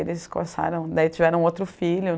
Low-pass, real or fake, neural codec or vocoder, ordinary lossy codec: none; real; none; none